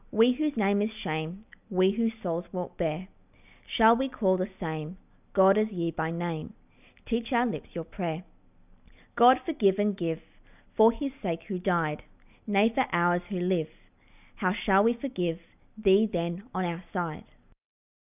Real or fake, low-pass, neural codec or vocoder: real; 3.6 kHz; none